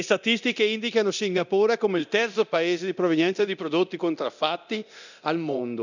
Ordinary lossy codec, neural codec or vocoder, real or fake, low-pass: none; codec, 24 kHz, 0.9 kbps, DualCodec; fake; 7.2 kHz